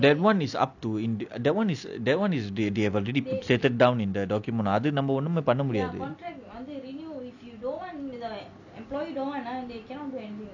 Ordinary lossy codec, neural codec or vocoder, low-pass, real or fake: none; none; 7.2 kHz; real